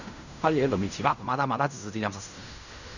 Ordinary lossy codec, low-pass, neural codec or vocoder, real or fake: none; 7.2 kHz; codec, 16 kHz in and 24 kHz out, 0.4 kbps, LongCat-Audio-Codec, fine tuned four codebook decoder; fake